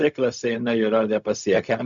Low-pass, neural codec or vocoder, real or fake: 7.2 kHz; codec, 16 kHz, 0.4 kbps, LongCat-Audio-Codec; fake